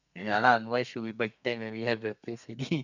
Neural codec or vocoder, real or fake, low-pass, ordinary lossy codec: codec, 32 kHz, 1.9 kbps, SNAC; fake; 7.2 kHz; none